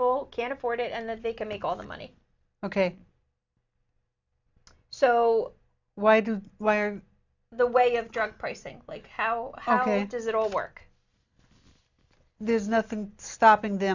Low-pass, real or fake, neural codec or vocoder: 7.2 kHz; real; none